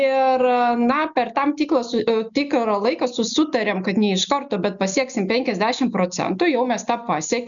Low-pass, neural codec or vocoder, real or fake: 7.2 kHz; none; real